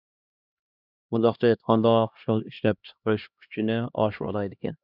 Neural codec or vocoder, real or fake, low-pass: codec, 16 kHz, 1 kbps, X-Codec, HuBERT features, trained on LibriSpeech; fake; 5.4 kHz